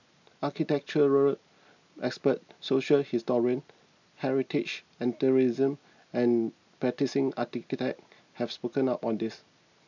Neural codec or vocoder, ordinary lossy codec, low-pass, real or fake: none; none; 7.2 kHz; real